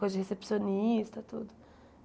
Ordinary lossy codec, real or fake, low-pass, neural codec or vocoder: none; real; none; none